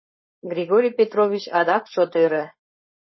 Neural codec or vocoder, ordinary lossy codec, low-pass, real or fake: codec, 16 kHz, 4.8 kbps, FACodec; MP3, 24 kbps; 7.2 kHz; fake